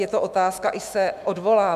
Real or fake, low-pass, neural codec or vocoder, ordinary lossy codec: fake; 14.4 kHz; autoencoder, 48 kHz, 128 numbers a frame, DAC-VAE, trained on Japanese speech; MP3, 96 kbps